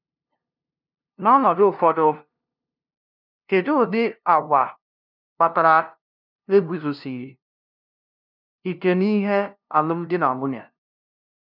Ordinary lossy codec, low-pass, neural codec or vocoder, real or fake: none; 5.4 kHz; codec, 16 kHz, 0.5 kbps, FunCodec, trained on LibriTTS, 25 frames a second; fake